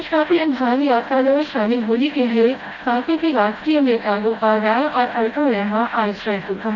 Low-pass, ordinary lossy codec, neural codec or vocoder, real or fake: 7.2 kHz; Opus, 64 kbps; codec, 16 kHz, 0.5 kbps, FreqCodec, smaller model; fake